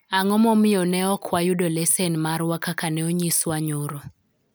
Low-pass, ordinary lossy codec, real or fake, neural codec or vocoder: none; none; real; none